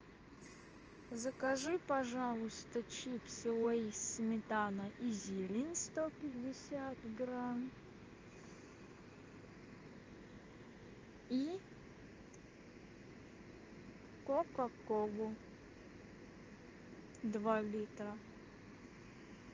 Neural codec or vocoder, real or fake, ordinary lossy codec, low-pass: codec, 16 kHz in and 24 kHz out, 1 kbps, XY-Tokenizer; fake; Opus, 24 kbps; 7.2 kHz